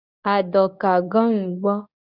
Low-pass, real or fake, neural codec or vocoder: 5.4 kHz; fake; codec, 24 kHz, 0.9 kbps, WavTokenizer, medium speech release version 2